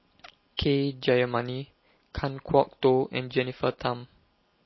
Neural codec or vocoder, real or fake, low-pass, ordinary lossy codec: none; real; 7.2 kHz; MP3, 24 kbps